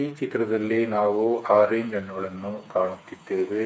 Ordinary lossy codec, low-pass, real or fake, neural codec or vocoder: none; none; fake; codec, 16 kHz, 4 kbps, FreqCodec, smaller model